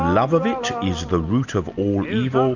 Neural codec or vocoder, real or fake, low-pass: none; real; 7.2 kHz